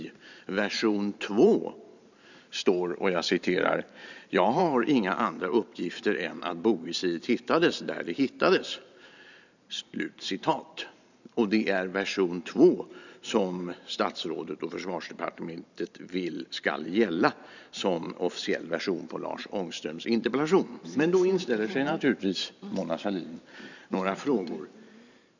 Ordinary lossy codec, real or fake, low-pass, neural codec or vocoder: none; fake; 7.2 kHz; vocoder, 44.1 kHz, 128 mel bands every 512 samples, BigVGAN v2